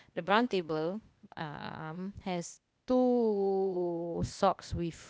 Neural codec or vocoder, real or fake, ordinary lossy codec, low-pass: codec, 16 kHz, 0.8 kbps, ZipCodec; fake; none; none